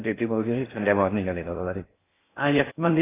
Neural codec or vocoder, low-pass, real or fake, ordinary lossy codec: codec, 16 kHz in and 24 kHz out, 0.6 kbps, FocalCodec, streaming, 4096 codes; 3.6 kHz; fake; AAC, 16 kbps